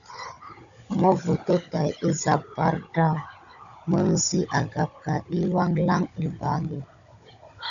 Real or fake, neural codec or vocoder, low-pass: fake; codec, 16 kHz, 16 kbps, FunCodec, trained on Chinese and English, 50 frames a second; 7.2 kHz